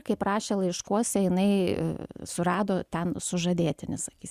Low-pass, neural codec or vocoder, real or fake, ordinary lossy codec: 14.4 kHz; none; real; Opus, 64 kbps